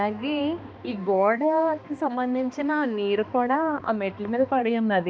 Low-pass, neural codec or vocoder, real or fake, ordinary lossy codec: none; codec, 16 kHz, 2 kbps, X-Codec, HuBERT features, trained on balanced general audio; fake; none